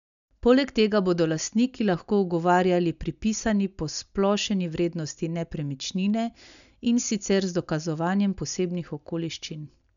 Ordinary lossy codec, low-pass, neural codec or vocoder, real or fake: none; 7.2 kHz; none; real